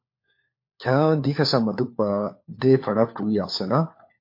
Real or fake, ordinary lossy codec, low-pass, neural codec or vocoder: fake; MP3, 32 kbps; 5.4 kHz; codec, 16 kHz, 4 kbps, FunCodec, trained on LibriTTS, 50 frames a second